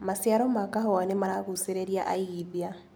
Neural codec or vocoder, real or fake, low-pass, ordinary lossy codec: none; real; none; none